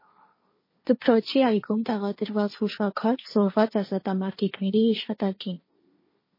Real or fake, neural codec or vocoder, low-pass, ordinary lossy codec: fake; codec, 16 kHz, 1.1 kbps, Voila-Tokenizer; 5.4 kHz; MP3, 24 kbps